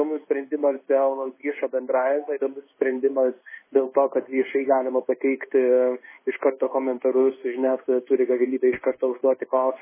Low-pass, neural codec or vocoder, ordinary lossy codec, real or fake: 3.6 kHz; codec, 16 kHz in and 24 kHz out, 1 kbps, XY-Tokenizer; MP3, 16 kbps; fake